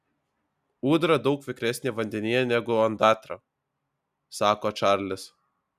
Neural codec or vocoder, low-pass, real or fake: none; 14.4 kHz; real